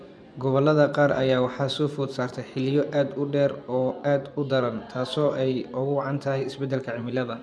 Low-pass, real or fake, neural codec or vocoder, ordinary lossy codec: none; fake; vocoder, 24 kHz, 100 mel bands, Vocos; none